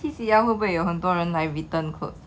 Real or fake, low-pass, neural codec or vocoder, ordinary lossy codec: real; none; none; none